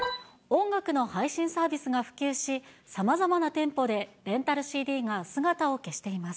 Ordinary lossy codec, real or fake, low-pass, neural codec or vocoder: none; real; none; none